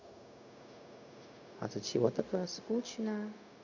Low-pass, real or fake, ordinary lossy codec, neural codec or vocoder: 7.2 kHz; fake; none; codec, 16 kHz, 0.4 kbps, LongCat-Audio-Codec